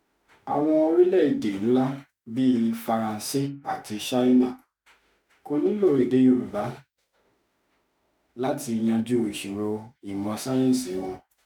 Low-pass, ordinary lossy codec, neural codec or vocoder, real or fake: none; none; autoencoder, 48 kHz, 32 numbers a frame, DAC-VAE, trained on Japanese speech; fake